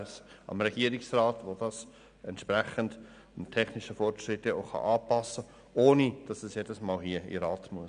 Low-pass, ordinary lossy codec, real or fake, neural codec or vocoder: 9.9 kHz; none; real; none